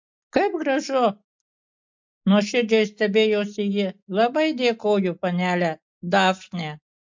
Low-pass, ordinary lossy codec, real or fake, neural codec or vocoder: 7.2 kHz; MP3, 48 kbps; real; none